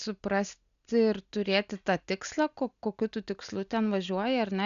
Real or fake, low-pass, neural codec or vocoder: real; 7.2 kHz; none